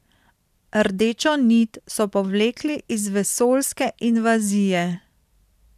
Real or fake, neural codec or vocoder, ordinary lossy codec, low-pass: fake; vocoder, 44.1 kHz, 128 mel bands every 512 samples, BigVGAN v2; none; 14.4 kHz